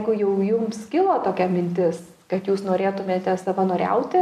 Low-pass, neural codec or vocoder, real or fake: 14.4 kHz; none; real